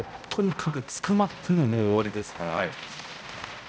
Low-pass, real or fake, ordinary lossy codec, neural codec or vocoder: none; fake; none; codec, 16 kHz, 0.5 kbps, X-Codec, HuBERT features, trained on balanced general audio